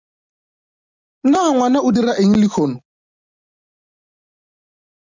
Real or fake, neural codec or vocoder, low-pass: real; none; 7.2 kHz